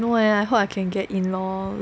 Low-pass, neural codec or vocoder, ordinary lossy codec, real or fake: none; none; none; real